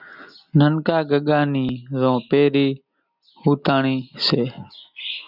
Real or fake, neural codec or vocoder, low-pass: real; none; 5.4 kHz